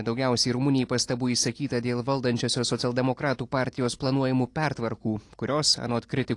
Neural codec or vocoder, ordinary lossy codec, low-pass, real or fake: none; AAC, 48 kbps; 10.8 kHz; real